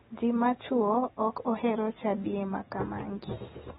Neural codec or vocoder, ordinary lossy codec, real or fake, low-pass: none; AAC, 16 kbps; real; 19.8 kHz